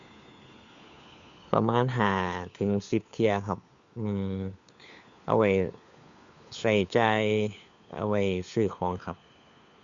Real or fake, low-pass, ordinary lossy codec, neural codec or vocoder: fake; 7.2 kHz; Opus, 64 kbps; codec, 16 kHz, 4 kbps, FunCodec, trained on LibriTTS, 50 frames a second